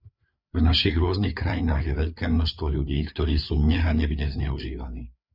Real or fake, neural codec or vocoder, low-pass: fake; codec, 16 kHz, 4 kbps, FreqCodec, larger model; 5.4 kHz